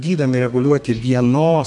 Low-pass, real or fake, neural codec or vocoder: 10.8 kHz; fake; codec, 32 kHz, 1.9 kbps, SNAC